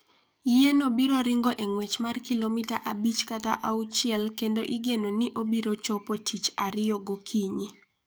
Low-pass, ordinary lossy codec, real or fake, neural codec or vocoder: none; none; fake; codec, 44.1 kHz, 7.8 kbps, DAC